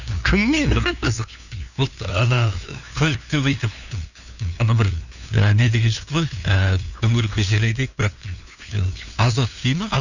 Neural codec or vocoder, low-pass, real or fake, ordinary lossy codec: codec, 16 kHz, 2 kbps, FunCodec, trained on LibriTTS, 25 frames a second; 7.2 kHz; fake; none